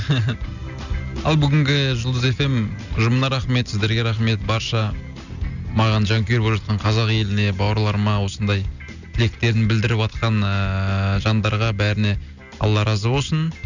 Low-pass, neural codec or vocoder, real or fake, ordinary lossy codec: 7.2 kHz; none; real; none